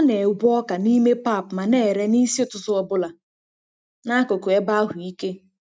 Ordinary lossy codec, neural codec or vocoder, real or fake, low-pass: none; none; real; none